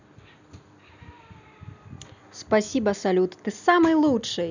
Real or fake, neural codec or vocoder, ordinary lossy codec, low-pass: real; none; none; 7.2 kHz